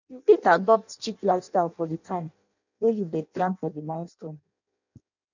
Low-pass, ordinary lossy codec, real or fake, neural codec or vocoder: 7.2 kHz; none; fake; codec, 16 kHz in and 24 kHz out, 0.6 kbps, FireRedTTS-2 codec